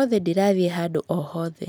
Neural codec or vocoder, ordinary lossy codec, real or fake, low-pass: none; none; real; none